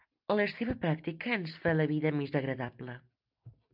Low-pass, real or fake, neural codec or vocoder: 5.4 kHz; real; none